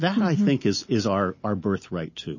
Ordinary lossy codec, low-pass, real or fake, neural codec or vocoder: MP3, 32 kbps; 7.2 kHz; real; none